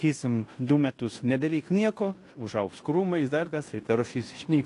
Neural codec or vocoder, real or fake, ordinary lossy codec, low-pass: codec, 16 kHz in and 24 kHz out, 0.9 kbps, LongCat-Audio-Codec, fine tuned four codebook decoder; fake; AAC, 48 kbps; 10.8 kHz